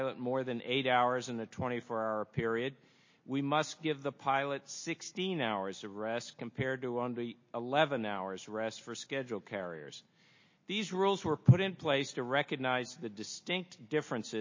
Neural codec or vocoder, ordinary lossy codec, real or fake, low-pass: none; MP3, 32 kbps; real; 7.2 kHz